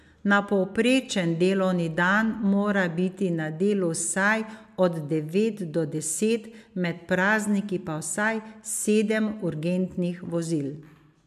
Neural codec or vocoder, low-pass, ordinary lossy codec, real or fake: none; 14.4 kHz; MP3, 96 kbps; real